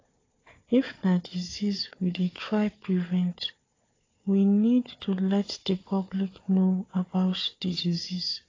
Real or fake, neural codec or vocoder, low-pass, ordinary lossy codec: fake; codec, 16 kHz, 4 kbps, FunCodec, trained on Chinese and English, 50 frames a second; 7.2 kHz; AAC, 32 kbps